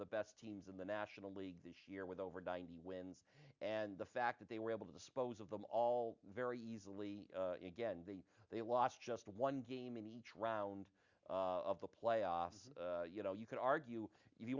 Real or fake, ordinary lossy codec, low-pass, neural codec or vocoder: real; Opus, 64 kbps; 7.2 kHz; none